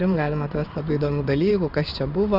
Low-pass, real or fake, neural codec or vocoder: 5.4 kHz; fake; codec, 16 kHz in and 24 kHz out, 1 kbps, XY-Tokenizer